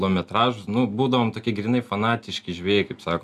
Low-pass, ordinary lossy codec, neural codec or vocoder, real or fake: 14.4 kHz; MP3, 96 kbps; none; real